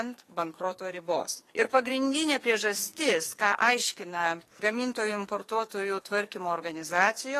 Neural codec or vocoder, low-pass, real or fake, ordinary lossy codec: codec, 44.1 kHz, 2.6 kbps, SNAC; 14.4 kHz; fake; AAC, 48 kbps